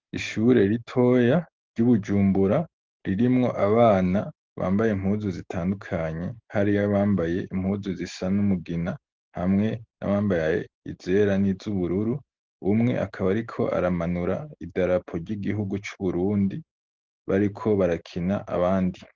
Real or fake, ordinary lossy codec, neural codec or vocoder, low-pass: real; Opus, 16 kbps; none; 7.2 kHz